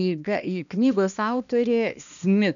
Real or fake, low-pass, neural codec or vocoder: fake; 7.2 kHz; codec, 16 kHz, 1 kbps, X-Codec, HuBERT features, trained on balanced general audio